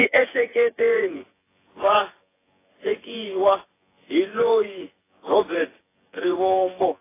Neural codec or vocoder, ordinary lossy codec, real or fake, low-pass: vocoder, 24 kHz, 100 mel bands, Vocos; AAC, 16 kbps; fake; 3.6 kHz